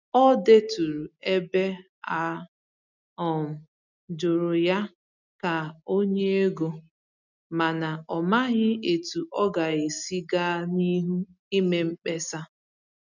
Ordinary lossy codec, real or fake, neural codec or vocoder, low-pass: none; real; none; 7.2 kHz